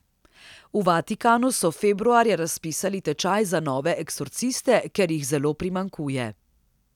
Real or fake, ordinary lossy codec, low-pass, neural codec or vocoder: real; none; 19.8 kHz; none